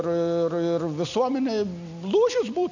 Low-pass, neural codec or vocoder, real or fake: 7.2 kHz; none; real